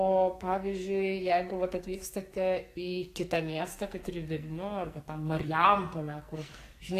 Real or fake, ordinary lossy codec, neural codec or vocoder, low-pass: fake; AAC, 64 kbps; codec, 44.1 kHz, 2.6 kbps, SNAC; 14.4 kHz